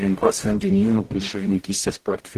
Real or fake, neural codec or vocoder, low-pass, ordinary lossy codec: fake; codec, 44.1 kHz, 0.9 kbps, DAC; 14.4 kHz; Opus, 16 kbps